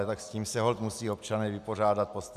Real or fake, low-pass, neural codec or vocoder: real; 14.4 kHz; none